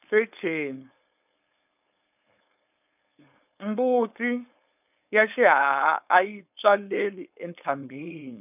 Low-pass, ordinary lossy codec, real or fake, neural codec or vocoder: 3.6 kHz; none; fake; codec, 16 kHz, 4.8 kbps, FACodec